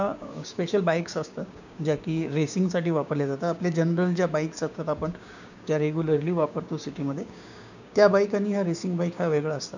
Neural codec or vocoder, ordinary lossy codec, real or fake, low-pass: codec, 16 kHz, 6 kbps, DAC; none; fake; 7.2 kHz